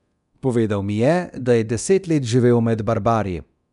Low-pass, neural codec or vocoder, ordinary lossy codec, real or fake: 10.8 kHz; codec, 24 kHz, 0.9 kbps, DualCodec; none; fake